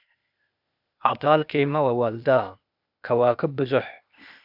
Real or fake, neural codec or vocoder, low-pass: fake; codec, 16 kHz, 0.8 kbps, ZipCodec; 5.4 kHz